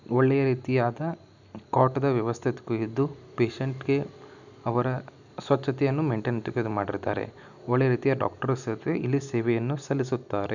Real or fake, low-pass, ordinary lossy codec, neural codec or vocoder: real; 7.2 kHz; none; none